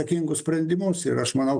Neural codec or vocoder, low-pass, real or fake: none; 9.9 kHz; real